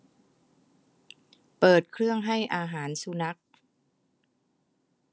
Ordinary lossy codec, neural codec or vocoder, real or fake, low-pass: none; none; real; none